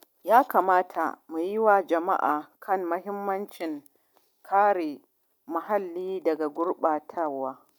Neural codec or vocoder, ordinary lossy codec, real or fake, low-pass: none; none; real; none